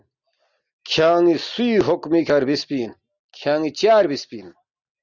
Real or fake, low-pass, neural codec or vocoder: real; 7.2 kHz; none